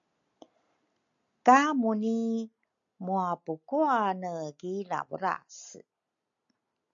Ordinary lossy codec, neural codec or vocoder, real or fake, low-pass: MP3, 96 kbps; none; real; 7.2 kHz